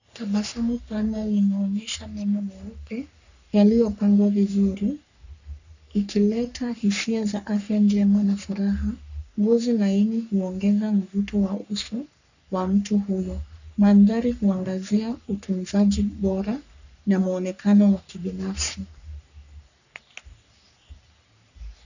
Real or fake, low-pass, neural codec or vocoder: fake; 7.2 kHz; codec, 44.1 kHz, 3.4 kbps, Pupu-Codec